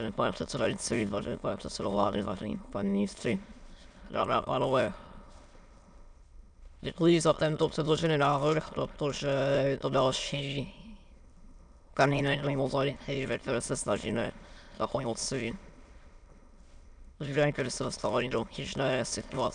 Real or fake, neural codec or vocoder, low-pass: fake; autoencoder, 22.05 kHz, a latent of 192 numbers a frame, VITS, trained on many speakers; 9.9 kHz